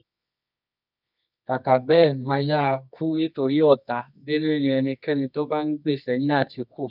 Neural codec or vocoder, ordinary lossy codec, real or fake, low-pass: codec, 24 kHz, 0.9 kbps, WavTokenizer, medium music audio release; none; fake; 5.4 kHz